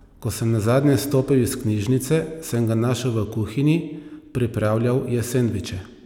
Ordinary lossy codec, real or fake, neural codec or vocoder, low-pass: none; real; none; 19.8 kHz